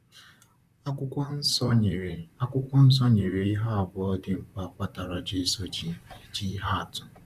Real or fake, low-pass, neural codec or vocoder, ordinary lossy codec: fake; 14.4 kHz; vocoder, 44.1 kHz, 128 mel bands, Pupu-Vocoder; none